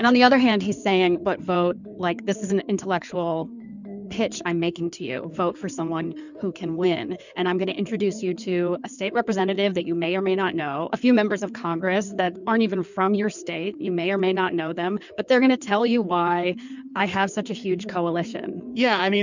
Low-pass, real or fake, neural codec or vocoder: 7.2 kHz; fake; codec, 16 kHz in and 24 kHz out, 2.2 kbps, FireRedTTS-2 codec